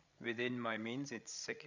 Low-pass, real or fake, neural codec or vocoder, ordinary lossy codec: 7.2 kHz; fake; codec, 16 kHz, 16 kbps, FreqCodec, larger model; none